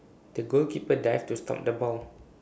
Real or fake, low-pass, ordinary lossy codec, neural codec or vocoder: real; none; none; none